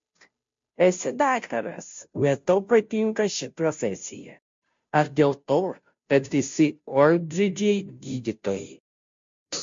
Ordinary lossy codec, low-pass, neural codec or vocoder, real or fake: MP3, 48 kbps; 7.2 kHz; codec, 16 kHz, 0.5 kbps, FunCodec, trained on Chinese and English, 25 frames a second; fake